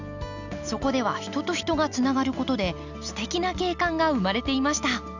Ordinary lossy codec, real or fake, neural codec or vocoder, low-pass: none; real; none; 7.2 kHz